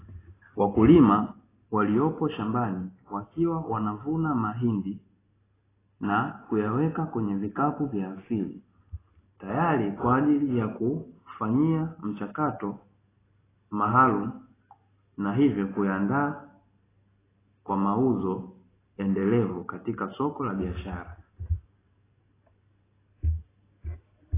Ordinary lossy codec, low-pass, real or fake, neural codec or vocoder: AAC, 16 kbps; 3.6 kHz; real; none